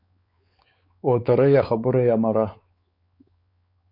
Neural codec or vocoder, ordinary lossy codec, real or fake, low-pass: codec, 16 kHz, 4 kbps, X-Codec, WavLM features, trained on Multilingual LibriSpeech; Opus, 64 kbps; fake; 5.4 kHz